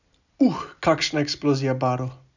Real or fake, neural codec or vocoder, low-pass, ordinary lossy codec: real; none; 7.2 kHz; none